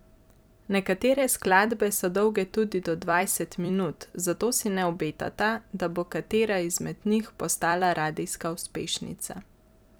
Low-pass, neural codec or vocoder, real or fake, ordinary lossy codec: none; vocoder, 44.1 kHz, 128 mel bands every 256 samples, BigVGAN v2; fake; none